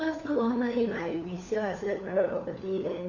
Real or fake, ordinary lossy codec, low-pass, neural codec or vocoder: fake; none; 7.2 kHz; codec, 16 kHz, 4 kbps, FunCodec, trained on LibriTTS, 50 frames a second